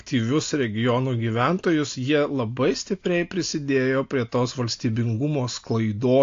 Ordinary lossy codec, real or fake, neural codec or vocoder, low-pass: AAC, 48 kbps; real; none; 7.2 kHz